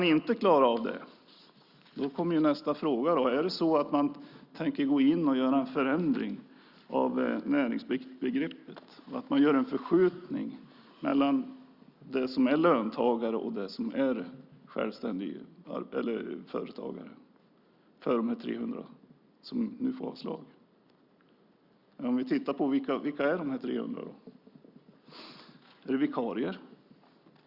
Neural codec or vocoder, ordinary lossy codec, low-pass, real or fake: none; Opus, 64 kbps; 5.4 kHz; real